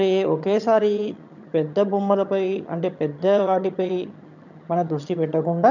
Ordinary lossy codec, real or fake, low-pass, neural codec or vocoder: none; fake; 7.2 kHz; vocoder, 22.05 kHz, 80 mel bands, HiFi-GAN